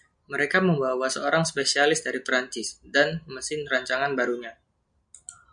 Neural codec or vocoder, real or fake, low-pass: none; real; 9.9 kHz